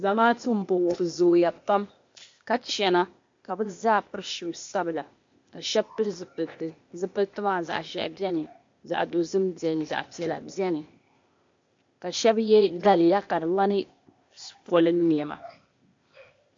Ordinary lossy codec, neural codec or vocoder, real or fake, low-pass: MP3, 48 kbps; codec, 16 kHz, 0.8 kbps, ZipCodec; fake; 7.2 kHz